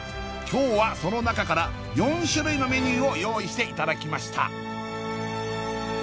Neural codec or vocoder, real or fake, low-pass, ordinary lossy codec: none; real; none; none